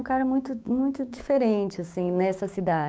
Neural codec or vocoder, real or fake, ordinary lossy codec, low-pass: codec, 16 kHz, 6 kbps, DAC; fake; none; none